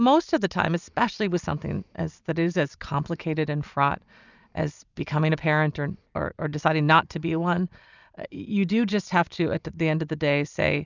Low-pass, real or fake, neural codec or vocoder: 7.2 kHz; real; none